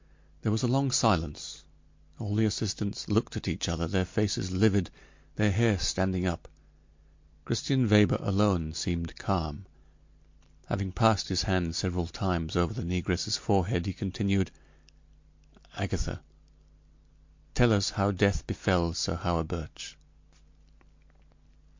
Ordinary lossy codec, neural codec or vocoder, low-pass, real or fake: MP3, 48 kbps; none; 7.2 kHz; real